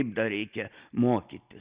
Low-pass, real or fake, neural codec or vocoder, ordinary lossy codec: 3.6 kHz; real; none; Opus, 64 kbps